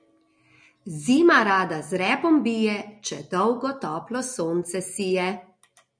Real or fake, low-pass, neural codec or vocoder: real; 9.9 kHz; none